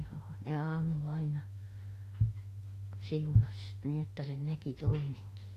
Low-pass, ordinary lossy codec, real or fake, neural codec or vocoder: 14.4 kHz; AAC, 48 kbps; fake; autoencoder, 48 kHz, 32 numbers a frame, DAC-VAE, trained on Japanese speech